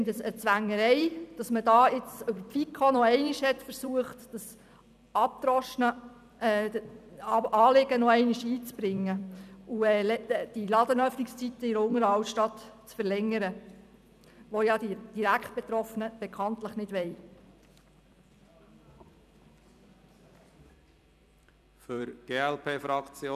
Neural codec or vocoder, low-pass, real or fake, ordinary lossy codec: vocoder, 44.1 kHz, 128 mel bands every 256 samples, BigVGAN v2; 14.4 kHz; fake; none